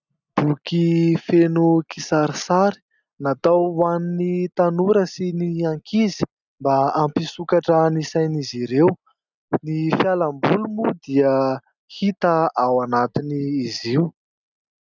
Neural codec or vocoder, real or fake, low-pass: none; real; 7.2 kHz